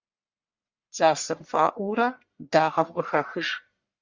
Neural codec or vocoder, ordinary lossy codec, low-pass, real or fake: codec, 44.1 kHz, 1.7 kbps, Pupu-Codec; Opus, 64 kbps; 7.2 kHz; fake